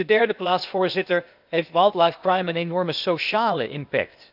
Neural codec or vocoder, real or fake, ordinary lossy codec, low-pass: codec, 16 kHz, 0.8 kbps, ZipCodec; fake; none; 5.4 kHz